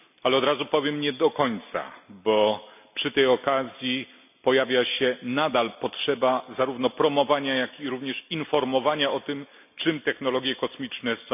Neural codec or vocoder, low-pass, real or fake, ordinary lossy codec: none; 3.6 kHz; real; none